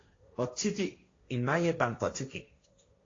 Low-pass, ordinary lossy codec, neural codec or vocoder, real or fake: 7.2 kHz; AAC, 32 kbps; codec, 16 kHz, 1.1 kbps, Voila-Tokenizer; fake